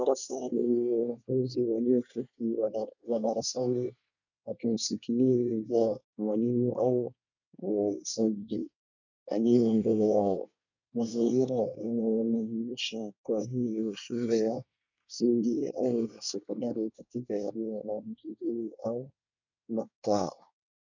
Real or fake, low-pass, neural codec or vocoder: fake; 7.2 kHz; codec, 24 kHz, 1 kbps, SNAC